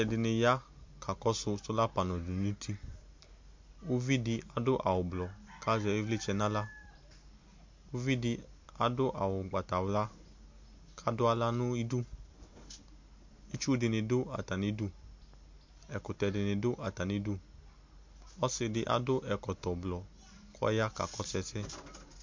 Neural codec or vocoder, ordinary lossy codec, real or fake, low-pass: none; MP3, 48 kbps; real; 7.2 kHz